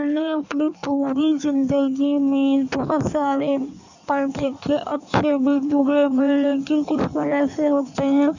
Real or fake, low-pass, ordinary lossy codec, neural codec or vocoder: fake; 7.2 kHz; none; codec, 16 kHz in and 24 kHz out, 1.1 kbps, FireRedTTS-2 codec